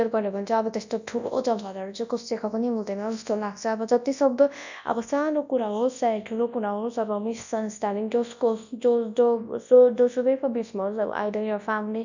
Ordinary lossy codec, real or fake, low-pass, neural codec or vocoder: none; fake; 7.2 kHz; codec, 24 kHz, 0.9 kbps, WavTokenizer, large speech release